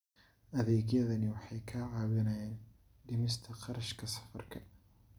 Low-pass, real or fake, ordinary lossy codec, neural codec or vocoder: 19.8 kHz; real; none; none